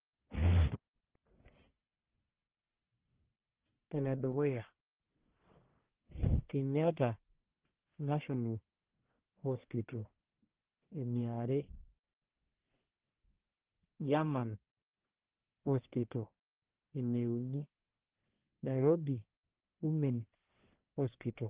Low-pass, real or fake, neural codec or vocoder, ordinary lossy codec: 3.6 kHz; fake; codec, 44.1 kHz, 1.7 kbps, Pupu-Codec; Opus, 16 kbps